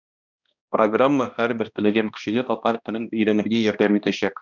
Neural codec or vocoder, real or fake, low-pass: codec, 16 kHz, 1 kbps, X-Codec, HuBERT features, trained on balanced general audio; fake; 7.2 kHz